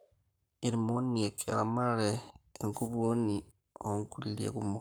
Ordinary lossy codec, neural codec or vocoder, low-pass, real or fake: none; codec, 44.1 kHz, 7.8 kbps, Pupu-Codec; none; fake